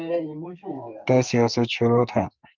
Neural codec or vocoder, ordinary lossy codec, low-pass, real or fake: codec, 44.1 kHz, 2.6 kbps, SNAC; Opus, 24 kbps; 7.2 kHz; fake